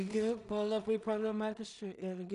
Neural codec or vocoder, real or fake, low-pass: codec, 16 kHz in and 24 kHz out, 0.4 kbps, LongCat-Audio-Codec, two codebook decoder; fake; 10.8 kHz